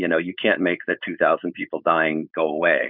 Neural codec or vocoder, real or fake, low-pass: codec, 16 kHz, 4.8 kbps, FACodec; fake; 5.4 kHz